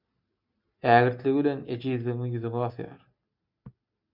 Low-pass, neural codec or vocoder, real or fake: 5.4 kHz; none; real